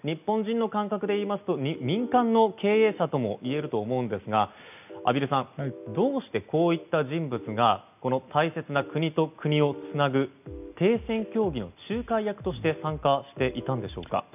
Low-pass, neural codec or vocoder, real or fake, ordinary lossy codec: 3.6 kHz; none; real; none